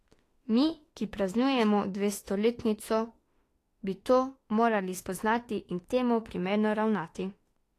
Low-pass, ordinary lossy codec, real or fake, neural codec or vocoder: 14.4 kHz; AAC, 48 kbps; fake; autoencoder, 48 kHz, 32 numbers a frame, DAC-VAE, trained on Japanese speech